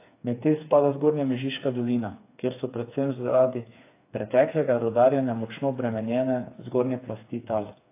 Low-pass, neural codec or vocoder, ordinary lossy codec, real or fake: 3.6 kHz; codec, 16 kHz, 4 kbps, FreqCodec, smaller model; AAC, 24 kbps; fake